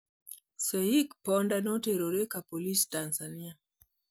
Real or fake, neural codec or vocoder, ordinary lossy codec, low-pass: real; none; none; none